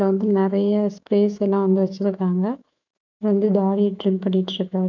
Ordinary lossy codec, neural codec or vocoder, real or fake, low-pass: none; autoencoder, 48 kHz, 128 numbers a frame, DAC-VAE, trained on Japanese speech; fake; 7.2 kHz